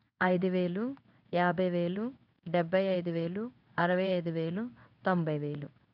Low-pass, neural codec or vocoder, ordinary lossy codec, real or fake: 5.4 kHz; codec, 16 kHz in and 24 kHz out, 1 kbps, XY-Tokenizer; none; fake